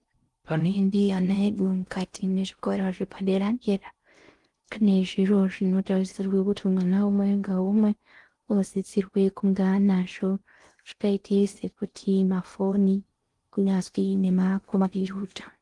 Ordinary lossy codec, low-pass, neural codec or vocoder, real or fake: Opus, 24 kbps; 10.8 kHz; codec, 16 kHz in and 24 kHz out, 0.6 kbps, FocalCodec, streaming, 4096 codes; fake